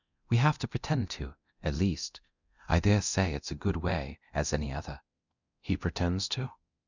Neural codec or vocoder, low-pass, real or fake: codec, 24 kHz, 0.9 kbps, DualCodec; 7.2 kHz; fake